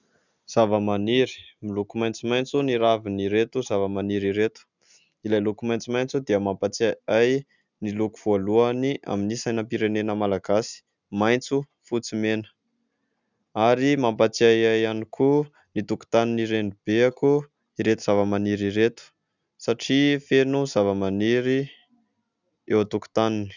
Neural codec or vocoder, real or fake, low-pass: none; real; 7.2 kHz